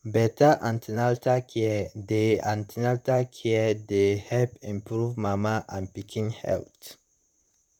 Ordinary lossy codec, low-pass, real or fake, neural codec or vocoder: none; 19.8 kHz; fake; vocoder, 44.1 kHz, 128 mel bands, Pupu-Vocoder